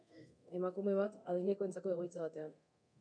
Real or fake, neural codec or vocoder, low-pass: fake; codec, 24 kHz, 0.9 kbps, DualCodec; 9.9 kHz